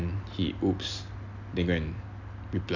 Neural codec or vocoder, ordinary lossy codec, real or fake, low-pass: none; AAC, 48 kbps; real; 7.2 kHz